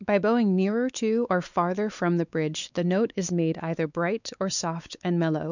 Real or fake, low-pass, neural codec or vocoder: fake; 7.2 kHz; codec, 16 kHz, 4 kbps, X-Codec, WavLM features, trained on Multilingual LibriSpeech